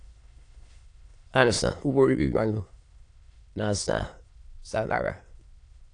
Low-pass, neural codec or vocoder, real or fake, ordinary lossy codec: 9.9 kHz; autoencoder, 22.05 kHz, a latent of 192 numbers a frame, VITS, trained on many speakers; fake; AAC, 48 kbps